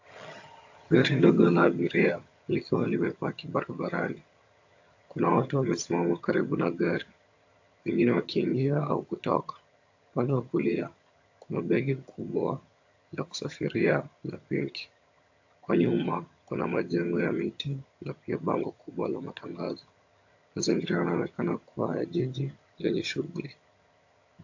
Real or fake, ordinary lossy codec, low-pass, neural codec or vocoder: fake; AAC, 48 kbps; 7.2 kHz; vocoder, 22.05 kHz, 80 mel bands, HiFi-GAN